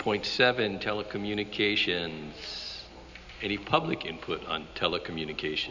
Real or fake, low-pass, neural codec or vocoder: real; 7.2 kHz; none